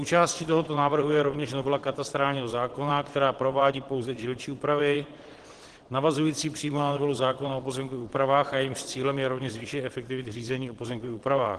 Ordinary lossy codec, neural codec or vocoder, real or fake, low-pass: Opus, 16 kbps; vocoder, 22.05 kHz, 80 mel bands, WaveNeXt; fake; 9.9 kHz